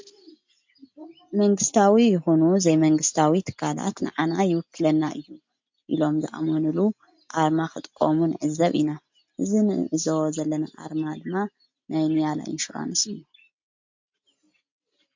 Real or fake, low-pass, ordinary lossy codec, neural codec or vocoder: real; 7.2 kHz; MP3, 48 kbps; none